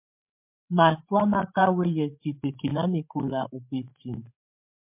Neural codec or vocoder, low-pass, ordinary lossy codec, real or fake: codec, 16 kHz, 8 kbps, FreqCodec, larger model; 3.6 kHz; MP3, 32 kbps; fake